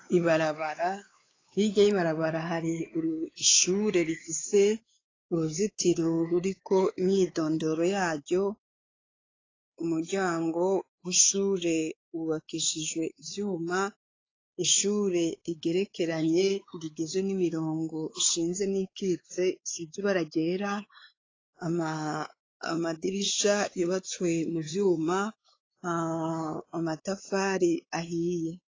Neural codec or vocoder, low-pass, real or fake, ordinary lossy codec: codec, 16 kHz, 4 kbps, X-Codec, WavLM features, trained on Multilingual LibriSpeech; 7.2 kHz; fake; AAC, 32 kbps